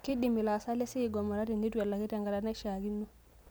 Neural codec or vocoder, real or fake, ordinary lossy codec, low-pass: none; real; none; none